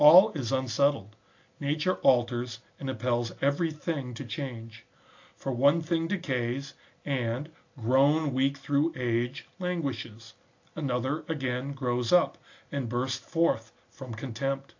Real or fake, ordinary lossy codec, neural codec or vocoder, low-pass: real; AAC, 48 kbps; none; 7.2 kHz